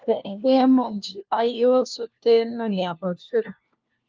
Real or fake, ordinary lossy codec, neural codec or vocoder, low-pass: fake; Opus, 24 kbps; codec, 16 kHz, 1 kbps, FunCodec, trained on LibriTTS, 50 frames a second; 7.2 kHz